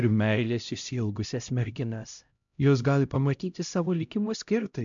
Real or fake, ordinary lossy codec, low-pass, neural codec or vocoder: fake; MP3, 64 kbps; 7.2 kHz; codec, 16 kHz, 0.5 kbps, X-Codec, HuBERT features, trained on LibriSpeech